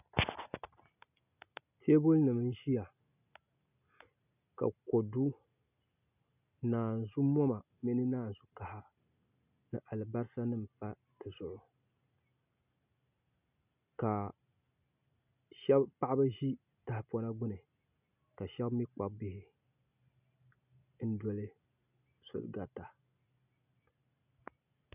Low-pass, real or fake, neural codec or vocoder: 3.6 kHz; real; none